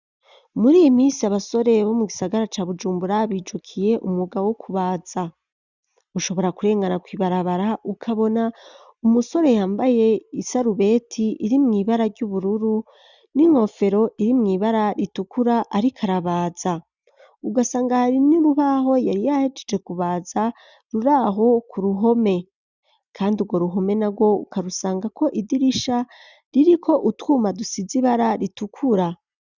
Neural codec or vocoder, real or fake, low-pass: none; real; 7.2 kHz